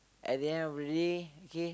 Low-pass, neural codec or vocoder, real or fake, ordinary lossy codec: none; none; real; none